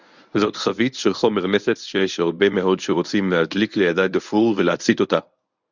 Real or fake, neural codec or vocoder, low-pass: fake; codec, 24 kHz, 0.9 kbps, WavTokenizer, medium speech release version 1; 7.2 kHz